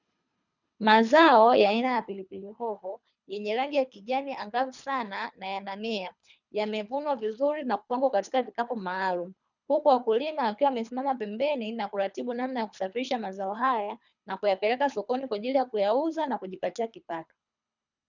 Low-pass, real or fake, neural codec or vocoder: 7.2 kHz; fake; codec, 24 kHz, 3 kbps, HILCodec